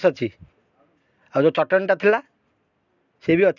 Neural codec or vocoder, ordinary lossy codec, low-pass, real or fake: none; none; 7.2 kHz; real